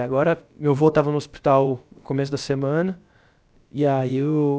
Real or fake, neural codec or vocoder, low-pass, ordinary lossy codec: fake; codec, 16 kHz, about 1 kbps, DyCAST, with the encoder's durations; none; none